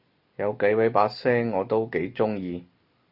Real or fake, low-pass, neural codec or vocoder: real; 5.4 kHz; none